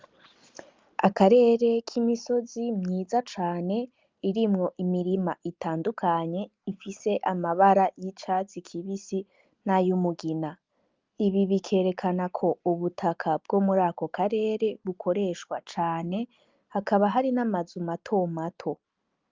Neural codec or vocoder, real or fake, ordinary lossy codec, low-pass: none; real; Opus, 24 kbps; 7.2 kHz